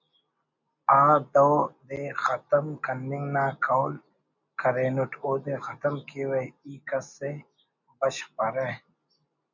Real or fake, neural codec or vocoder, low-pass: real; none; 7.2 kHz